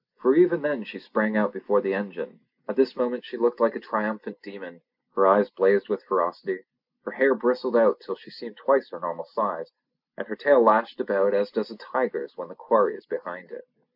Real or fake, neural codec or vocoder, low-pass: real; none; 5.4 kHz